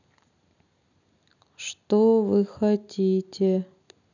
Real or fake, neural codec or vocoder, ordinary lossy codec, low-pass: real; none; none; 7.2 kHz